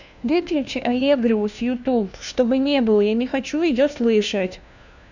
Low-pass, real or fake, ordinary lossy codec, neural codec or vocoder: 7.2 kHz; fake; none; codec, 16 kHz, 1 kbps, FunCodec, trained on LibriTTS, 50 frames a second